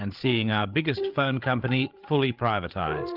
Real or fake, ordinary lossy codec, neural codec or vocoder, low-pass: fake; Opus, 24 kbps; codec, 16 kHz, 8 kbps, FreqCodec, larger model; 5.4 kHz